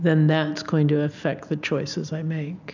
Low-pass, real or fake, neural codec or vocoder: 7.2 kHz; real; none